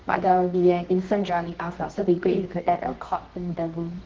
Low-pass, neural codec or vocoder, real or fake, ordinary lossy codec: 7.2 kHz; codec, 24 kHz, 0.9 kbps, WavTokenizer, medium music audio release; fake; Opus, 16 kbps